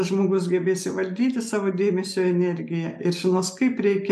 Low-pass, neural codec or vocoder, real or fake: 14.4 kHz; none; real